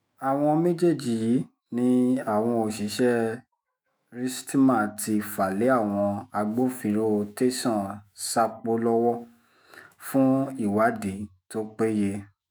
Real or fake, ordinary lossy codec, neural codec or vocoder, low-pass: fake; none; autoencoder, 48 kHz, 128 numbers a frame, DAC-VAE, trained on Japanese speech; none